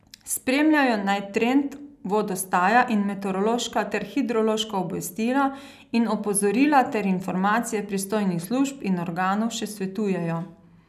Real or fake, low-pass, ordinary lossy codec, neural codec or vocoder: real; 14.4 kHz; none; none